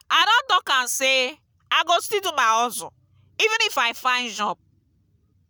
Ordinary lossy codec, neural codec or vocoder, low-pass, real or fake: none; none; none; real